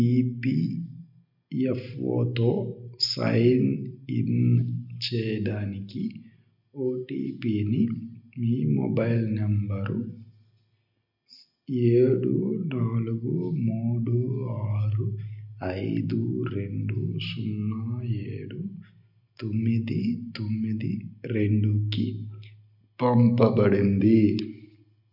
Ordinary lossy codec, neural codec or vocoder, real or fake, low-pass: none; none; real; 5.4 kHz